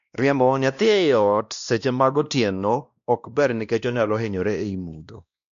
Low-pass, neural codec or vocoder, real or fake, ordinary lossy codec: 7.2 kHz; codec, 16 kHz, 1 kbps, X-Codec, WavLM features, trained on Multilingual LibriSpeech; fake; none